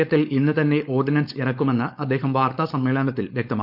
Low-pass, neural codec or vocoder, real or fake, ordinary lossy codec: 5.4 kHz; codec, 16 kHz, 4.8 kbps, FACodec; fake; none